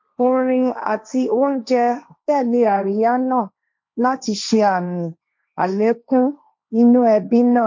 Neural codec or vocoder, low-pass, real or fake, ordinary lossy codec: codec, 16 kHz, 1.1 kbps, Voila-Tokenizer; 7.2 kHz; fake; MP3, 48 kbps